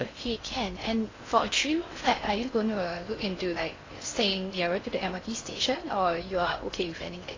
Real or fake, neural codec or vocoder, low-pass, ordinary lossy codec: fake; codec, 16 kHz in and 24 kHz out, 0.6 kbps, FocalCodec, streaming, 4096 codes; 7.2 kHz; AAC, 32 kbps